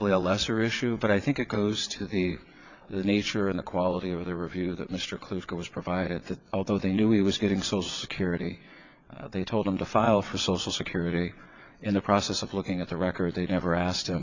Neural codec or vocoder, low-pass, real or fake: vocoder, 22.05 kHz, 80 mel bands, WaveNeXt; 7.2 kHz; fake